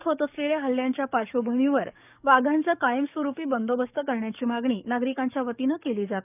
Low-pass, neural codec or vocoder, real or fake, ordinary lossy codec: 3.6 kHz; codec, 44.1 kHz, 7.8 kbps, DAC; fake; none